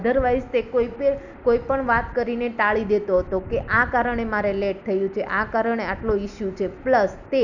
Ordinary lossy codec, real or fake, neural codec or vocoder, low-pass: none; real; none; 7.2 kHz